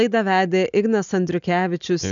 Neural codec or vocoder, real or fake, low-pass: none; real; 7.2 kHz